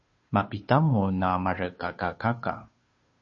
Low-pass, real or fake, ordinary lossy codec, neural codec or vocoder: 7.2 kHz; fake; MP3, 32 kbps; codec, 16 kHz, 2 kbps, FunCodec, trained on Chinese and English, 25 frames a second